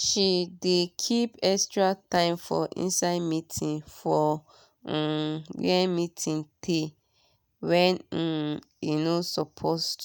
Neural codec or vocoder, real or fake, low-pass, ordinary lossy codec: none; real; none; none